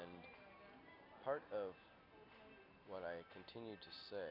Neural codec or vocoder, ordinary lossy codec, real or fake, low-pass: none; AAC, 32 kbps; real; 5.4 kHz